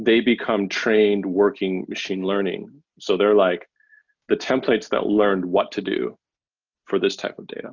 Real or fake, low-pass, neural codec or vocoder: real; 7.2 kHz; none